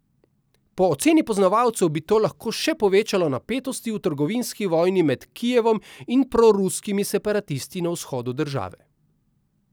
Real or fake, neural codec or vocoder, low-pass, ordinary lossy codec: real; none; none; none